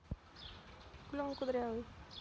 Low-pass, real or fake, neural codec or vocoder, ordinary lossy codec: none; real; none; none